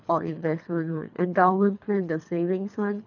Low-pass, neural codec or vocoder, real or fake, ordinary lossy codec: 7.2 kHz; codec, 24 kHz, 3 kbps, HILCodec; fake; none